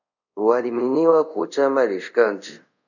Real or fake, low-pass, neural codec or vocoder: fake; 7.2 kHz; codec, 24 kHz, 0.5 kbps, DualCodec